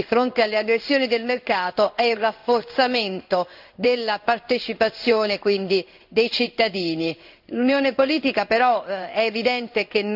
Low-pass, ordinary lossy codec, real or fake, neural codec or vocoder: 5.4 kHz; none; fake; codec, 16 kHz in and 24 kHz out, 1 kbps, XY-Tokenizer